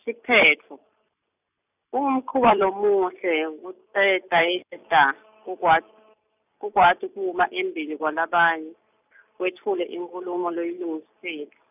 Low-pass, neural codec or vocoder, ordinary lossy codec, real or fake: 3.6 kHz; none; none; real